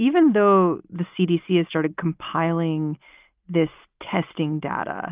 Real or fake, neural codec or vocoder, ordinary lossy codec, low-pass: real; none; Opus, 24 kbps; 3.6 kHz